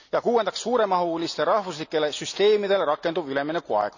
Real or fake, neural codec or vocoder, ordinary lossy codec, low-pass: real; none; none; 7.2 kHz